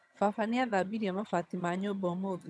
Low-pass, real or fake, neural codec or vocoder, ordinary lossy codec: none; fake; vocoder, 22.05 kHz, 80 mel bands, HiFi-GAN; none